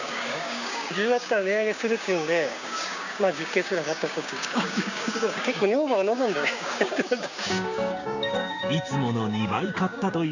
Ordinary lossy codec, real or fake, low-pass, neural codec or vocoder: none; fake; 7.2 kHz; codec, 16 kHz, 6 kbps, DAC